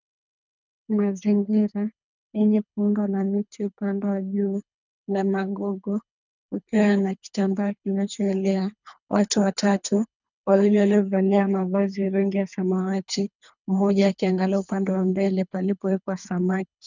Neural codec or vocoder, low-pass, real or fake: codec, 24 kHz, 3 kbps, HILCodec; 7.2 kHz; fake